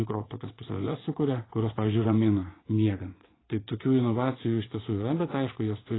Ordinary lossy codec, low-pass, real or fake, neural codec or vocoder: AAC, 16 kbps; 7.2 kHz; fake; vocoder, 24 kHz, 100 mel bands, Vocos